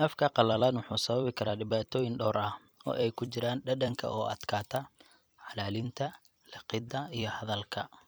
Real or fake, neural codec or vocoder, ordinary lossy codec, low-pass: fake; vocoder, 44.1 kHz, 128 mel bands every 256 samples, BigVGAN v2; none; none